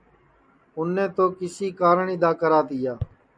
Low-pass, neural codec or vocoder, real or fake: 9.9 kHz; none; real